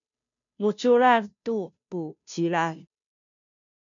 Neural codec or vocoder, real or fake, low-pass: codec, 16 kHz, 0.5 kbps, FunCodec, trained on Chinese and English, 25 frames a second; fake; 7.2 kHz